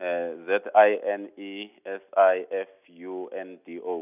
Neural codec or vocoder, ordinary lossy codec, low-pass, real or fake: none; none; 3.6 kHz; real